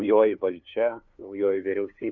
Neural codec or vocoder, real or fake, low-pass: codec, 16 kHz, 2 kbps, FunCodec, trained on LibriTTS, 25 frames a second; fake; 7.2 kHz